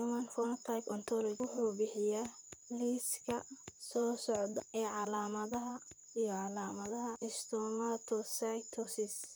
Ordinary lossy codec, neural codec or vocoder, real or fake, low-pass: none; vocoder, 44.1 kHz, 128 mel bands, Pupu-Vocoder; fake; none